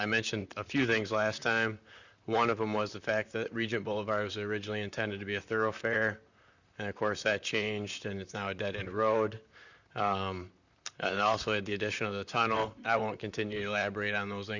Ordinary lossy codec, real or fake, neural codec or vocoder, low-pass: Opus, 64 kbps; fake; vocoder, 44.1 kHz, 128 mel bands, Pupu-Vocoder; 7.2 kHz